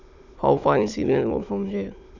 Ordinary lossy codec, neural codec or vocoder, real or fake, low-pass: none; autoencoder, 22.05 kHz, a latent of 192 numbers a frame, VITS, trained on many speakers; fake; 7.2 kHz